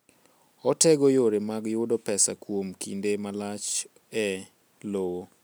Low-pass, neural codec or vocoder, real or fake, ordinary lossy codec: none; none; real; none